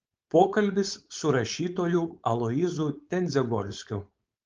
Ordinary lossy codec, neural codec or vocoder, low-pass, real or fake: Opus, 24 kbps; codec, 16 kHz, 4.8 kbps, FACodec; 7.2 kHz; fake